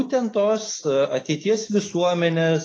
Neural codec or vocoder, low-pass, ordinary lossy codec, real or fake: autoencoder, 48 kHz, 128 numbers a frame, DAC-VAE, trained on Japanese speech; 9.9 kHz; AAC, 32 kbps; fake